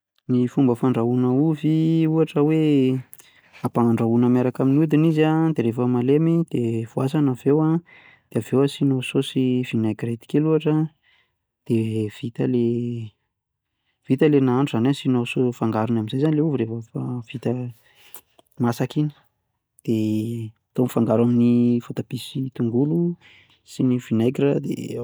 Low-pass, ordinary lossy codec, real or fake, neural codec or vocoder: none; none; real; none